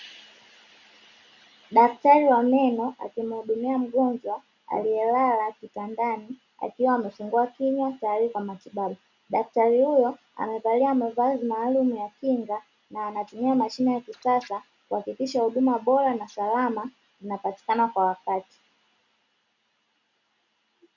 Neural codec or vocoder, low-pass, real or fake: none; 7.2 kHz; real